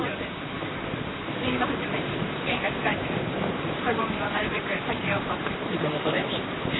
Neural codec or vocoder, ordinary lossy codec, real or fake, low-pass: vocoder, 44.1 kHz, 128 mel bands, Pupu-Vocoder; AAC, 16 kbps; fake; 7.2 kHz